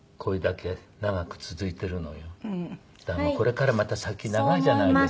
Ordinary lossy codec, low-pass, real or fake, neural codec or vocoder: none; none; real; none